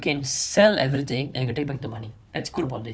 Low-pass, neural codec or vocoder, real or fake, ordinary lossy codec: none; codec, 16 kHz, 4 kbps, FunCodec, trained on Chinese and English, 50 frames a second; fake; none